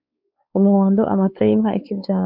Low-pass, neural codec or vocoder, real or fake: 5.4 kHz; codec, 16 kHz, 4 kbps, X-Codec, WavLM features, trained on Multilingual LibriSpeech; fake